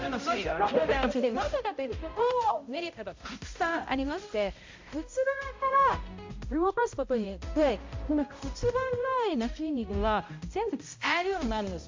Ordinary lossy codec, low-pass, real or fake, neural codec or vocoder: MP3, 48 kbps; 7.2 kHz; fake; codec, 16 kHz, 0.5 kbps, X-Codec, HuBERT features, trained on balanced general audio